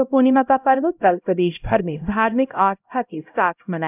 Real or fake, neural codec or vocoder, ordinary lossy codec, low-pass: fake; codec, 16 kHz, 0.5 kbps, X-Codec, HuBERT features, trained on LibriSpeech; none; 3.6 kHz